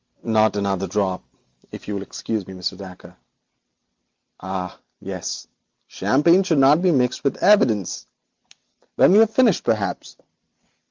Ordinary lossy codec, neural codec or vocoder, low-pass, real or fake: Opus, 32 kbps; none; 7.2 kHz; real